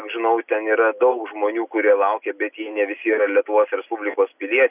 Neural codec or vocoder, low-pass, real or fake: none; 3.6 kHz; real